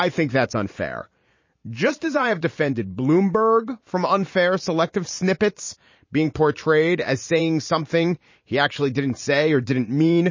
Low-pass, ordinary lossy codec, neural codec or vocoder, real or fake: 7.2 kHz; MP3, 32 kbps; vocoder, 44.1 kHz, 128 mel bands every 512 samples, BigVGAN v2; fake